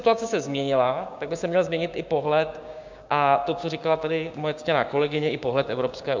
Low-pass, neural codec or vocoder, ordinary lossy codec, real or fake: 7.2 kHz; codec, 16 kHz, 6 kbps, DAC; MP3, 64 kbps; fake